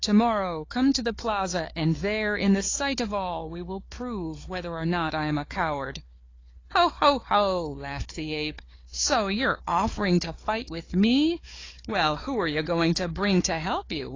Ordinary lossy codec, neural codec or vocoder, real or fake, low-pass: AAC, 32 kbps; codec, 16 kHz, 4 kbps, FunCodec, trained on Chinese and English, 50 frames a second; fake; 7.2 kHz